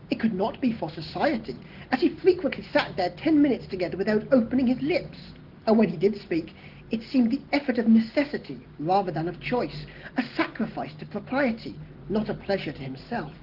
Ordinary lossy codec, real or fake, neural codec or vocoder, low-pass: Opus, 16 kbps; real; none; 5.4 kHz